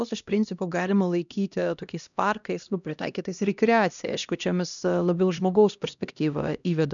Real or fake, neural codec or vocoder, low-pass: fake; codec, 16 kHz, 1 kbps, X-Codec, HuBERT features, trained on LibriSpeech; 7.2 kHz